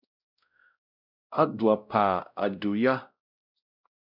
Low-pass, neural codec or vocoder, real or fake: 5.4 kHz; codec, 16 kHz, 0.5 kbps, X-Codec, WavLM features, trained on Multilingual LibriSpeech; fake